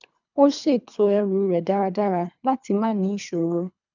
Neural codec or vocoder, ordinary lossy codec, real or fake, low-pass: codec, 24 kHz, 3 kbps, HILCodec; none; fake; 7.2 kHz